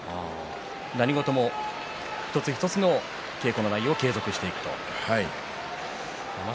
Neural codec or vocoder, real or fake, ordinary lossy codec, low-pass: none; real; none; none